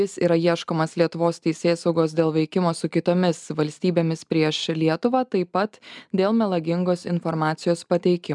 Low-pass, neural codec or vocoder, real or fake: 10.8 kHz; none; real